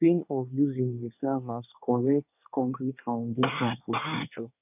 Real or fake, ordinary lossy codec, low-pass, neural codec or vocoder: fake; none; 3.6 kHz; codec, 24 kHz, 1 kbps, SNAC